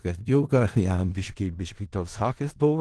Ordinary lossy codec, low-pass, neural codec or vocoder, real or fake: Opus, 16 kbps; 10.8 kHz; codec, 16 kHz in and 24 kHz out, 0.4 kbps, LongCat-Audio-Codec, four codebook decoder; fake